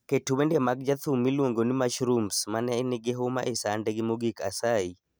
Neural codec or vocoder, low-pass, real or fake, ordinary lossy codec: none; none; real; none